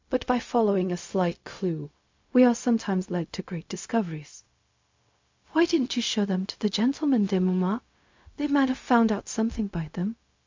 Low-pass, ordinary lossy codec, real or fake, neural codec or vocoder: 7.2 kHz; MP3, 64 kbps; fake; codec, 16 kHz, 0.4 kbps, LongCat-Audio-Codec